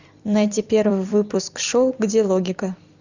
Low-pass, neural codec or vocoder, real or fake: 7.2 kHz; vocoder, 44.1 kHz, 128 mel bands every 256 samples, BigVGAN v2; fake